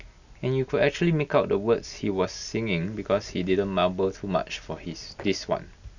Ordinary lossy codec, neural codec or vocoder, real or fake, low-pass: none; none; real; 7.2 kHz